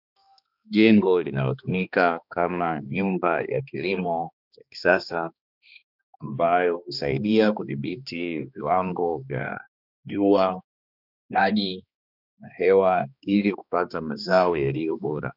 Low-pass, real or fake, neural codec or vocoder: 5.4 kHz; fake; codec, 16 kHz, 2 kbps, X-Codec, HuBERT features, trained on balanced general audio